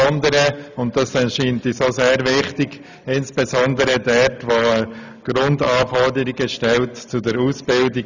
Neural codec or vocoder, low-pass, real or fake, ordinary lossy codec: none; 7.2 kHz; real; none